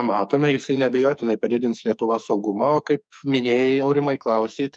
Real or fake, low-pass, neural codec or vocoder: fake; 9.9 kHz; codec, 44.1 kHz, 2.6 kbps, SNAC